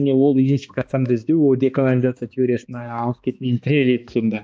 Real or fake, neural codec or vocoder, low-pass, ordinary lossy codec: fake; codec, 16 kHz, 2 kbps, X-Codec, HuBERT features, trained on balanced general audio; none; none